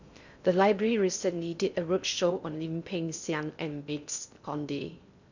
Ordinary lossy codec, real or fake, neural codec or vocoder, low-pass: none; fake; codec, 16 kHz in and 24 kHz out, 0.6 kbps, FocalCodec, streaming, 2048 codes; 7.2 kHz